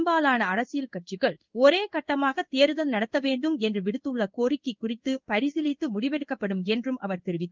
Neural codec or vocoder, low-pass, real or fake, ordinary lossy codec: codec, 16 kHz in and 24 kHz out, 1 kbps, XY-Tokenizer; 7.2 kHz; fake; Opus, 32 kbps